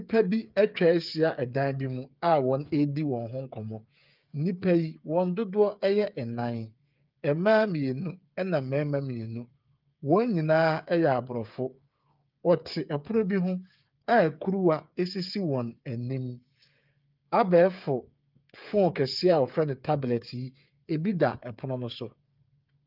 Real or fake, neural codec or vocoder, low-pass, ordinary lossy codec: fake; codec, 16 kHz, 8 kbps, FreqCodec, smaller model; 5.4 kHz; Opus, 24 kbps